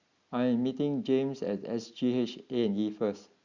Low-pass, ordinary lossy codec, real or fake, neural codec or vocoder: 7.2 kHz; Opus, 64 kbps; real; none